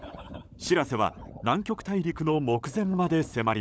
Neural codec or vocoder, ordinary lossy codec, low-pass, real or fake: codec, 16 kHz, 16 kbps, FunCodec, trained on LibriTTS, 50 frames a second; none; none; fake